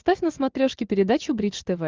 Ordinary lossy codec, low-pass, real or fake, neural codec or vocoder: Opus, 24 kbps; 7.2 kHz; real; none